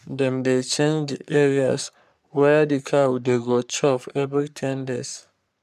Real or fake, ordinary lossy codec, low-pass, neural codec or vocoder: fake; none; 14.4 kHz; codec, 44.1 kHz, 3.4 kbps, Pupu-Codec